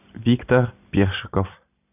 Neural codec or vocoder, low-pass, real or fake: none; 3.6 kHz; real